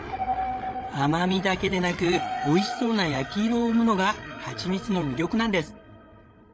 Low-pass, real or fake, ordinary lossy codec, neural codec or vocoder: none; fake; none; codec, 16 kHz, 8 kbps, FreqCodec, larger model